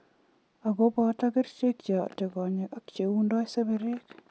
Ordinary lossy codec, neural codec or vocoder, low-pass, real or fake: none; none; none; real